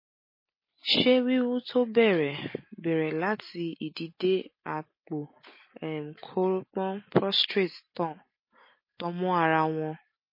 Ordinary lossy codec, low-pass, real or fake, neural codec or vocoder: MP3, 24 kbps; 5.4 kHz; real; none